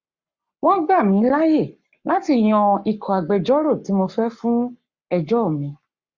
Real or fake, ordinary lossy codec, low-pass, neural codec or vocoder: fake; Opus, 64 kbps; 7.2 kHz; codec, 44.1 kHz, 7.8 kbps, Pupu-Codec